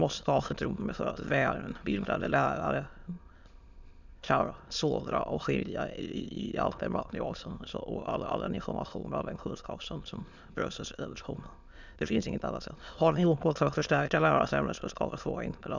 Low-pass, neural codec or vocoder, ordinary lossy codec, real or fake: 7.2 kHz; autoencoder, 22.05 kHz, a latent of 192 numbers a frame, VITS, trained on many speakers; none; fake